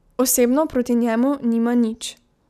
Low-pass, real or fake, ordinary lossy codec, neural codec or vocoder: 14.4 kHz; real; none; none